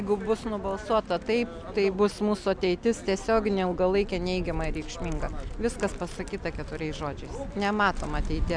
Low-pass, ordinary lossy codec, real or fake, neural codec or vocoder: 9.9 kHz; MP3, 96 kbps; real; none